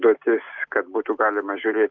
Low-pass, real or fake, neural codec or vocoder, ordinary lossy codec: 7.2 kHz; real; none; Opus, 24 kbps